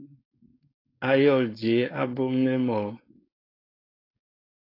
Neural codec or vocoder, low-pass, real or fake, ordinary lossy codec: codec, 16 kHz, 4.8 kbps, FACodec; 5.4 kHz; fake; AAC, 24 kbps